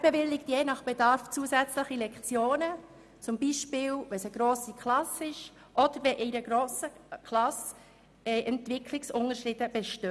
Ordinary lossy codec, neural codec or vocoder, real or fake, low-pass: none; none; real; none